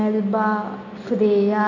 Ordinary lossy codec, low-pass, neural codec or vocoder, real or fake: none; 7.2 kHz; none; real